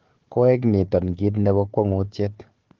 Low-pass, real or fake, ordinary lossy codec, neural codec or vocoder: 7.2 kHz; fake; Opus, 16 kbps; codec, 16 kHz, 4 kbps, FunCodec, trained on Chinese and English, 50 frames a second